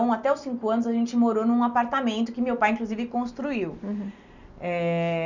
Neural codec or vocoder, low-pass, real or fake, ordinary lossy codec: none; 7.2 kHz; real; none